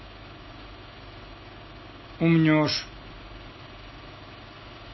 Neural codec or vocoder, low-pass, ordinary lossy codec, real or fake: none; 7.2 kHz; MP3, 24 kbps; real